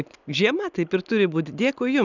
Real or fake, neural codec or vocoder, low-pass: real; none; 7.2 kHz